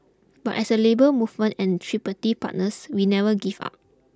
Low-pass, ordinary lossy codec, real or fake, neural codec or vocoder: none; none; real; none